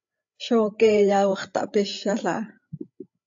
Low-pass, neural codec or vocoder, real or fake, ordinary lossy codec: 7.2 kHz; codec, 16 kHz, 8 kbps, FreqCodec, larger model; fake; AAC, 64 kbps